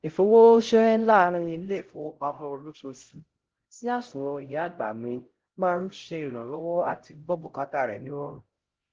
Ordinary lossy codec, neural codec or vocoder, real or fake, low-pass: Opus, 16 kbps; codec, 16 kHz, 0.5 kbps, X-Codec, HuBERT features, trained on LibriSpeech; fake; 7.2 kHz